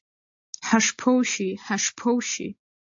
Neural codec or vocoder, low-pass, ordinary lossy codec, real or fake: none; 7.2 kHz; AAC, 64 kbps; real